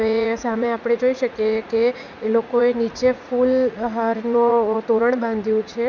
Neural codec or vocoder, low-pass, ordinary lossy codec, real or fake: vocoder, 44.1 kHz, 80 mel bands, Vocos; 7.2 kHz; none; fake